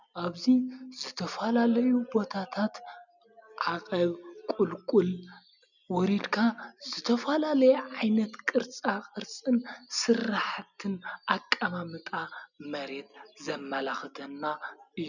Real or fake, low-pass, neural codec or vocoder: real; 7.2 kHz; none